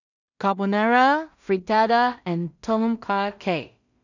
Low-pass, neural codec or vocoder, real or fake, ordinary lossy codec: 7.2 kHz; codec, 16 kHz in and 24 kHz out, 0.4 kbps, LongCat-Audio-Codec, two codebook decoder; fake; none